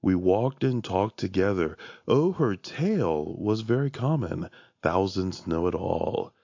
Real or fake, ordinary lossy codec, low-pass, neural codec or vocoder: real; AAC, 48 kbps; 7.2 kHz; none